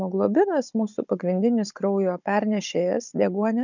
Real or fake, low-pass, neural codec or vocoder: fake; 7.2 kHz; codec, 16 kHz, 16 kbps, FunCodec, trained on Chinese and English, 50 frames a second